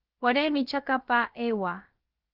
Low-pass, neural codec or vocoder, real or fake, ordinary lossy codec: 5.4 kHz; codec, 16 kHz, about 1 kbps, DyCAST, with the encoder's durations; fake; Opus, 32 kbps